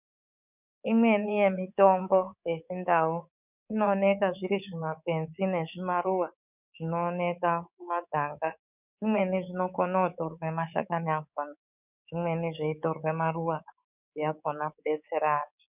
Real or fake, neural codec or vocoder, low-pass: fake; codec, 24 kHz, 3.1 kbps, DualCodec; 3.6 kHz